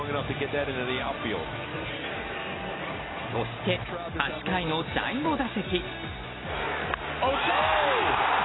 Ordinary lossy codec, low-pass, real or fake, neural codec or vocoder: AAC, 16 kbps; 7.2 kHz; real; none